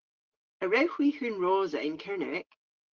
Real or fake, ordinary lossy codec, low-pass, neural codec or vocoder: real; Opus, 16 kbps; 7.2 kHz; none